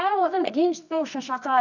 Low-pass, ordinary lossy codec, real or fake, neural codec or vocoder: 7.2 kHz; none; fake; codec, 24 kHz, 0.9 kbps, WavTokenizer, medium music audio release